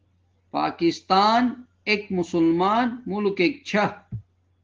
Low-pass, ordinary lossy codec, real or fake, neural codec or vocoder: 7.2 kHz; Opus, 16 kbps; real; none